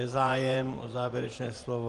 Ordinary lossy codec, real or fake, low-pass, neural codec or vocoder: Opus, 32 kbps; fake; 10.8 kHz; vocoder, 24 kHz, 100 mel bands, Vocos